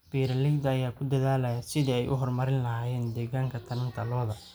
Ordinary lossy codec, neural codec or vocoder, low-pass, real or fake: none; none; none; real